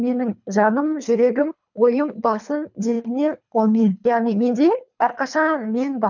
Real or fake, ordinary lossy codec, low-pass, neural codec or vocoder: fake; none; 7.2 kHz; codec, 24 kHz, 3 kbps, HILCodec